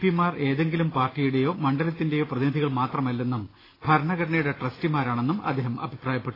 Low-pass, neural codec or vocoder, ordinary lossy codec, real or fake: 5.4 kHz; none; AAC, 24 kbps; real